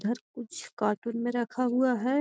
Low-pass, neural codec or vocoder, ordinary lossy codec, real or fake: none; none; none; real